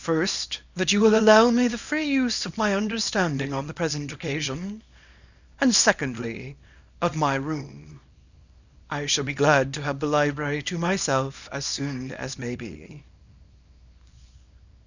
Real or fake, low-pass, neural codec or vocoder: fake; 7.2 kHz; codec, 24 kHz, 0.9 kbps, WavTokenizer, small release